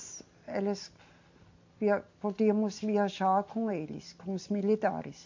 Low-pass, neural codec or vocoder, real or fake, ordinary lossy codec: 7.2 kHz; none; real; MP3, 48 kbps